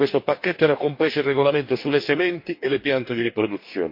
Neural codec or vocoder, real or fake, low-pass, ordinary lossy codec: codec, 44.1 kHz, 2.6 kbps, DAC; fake; 5.4 kHz; MP3, 32 kbps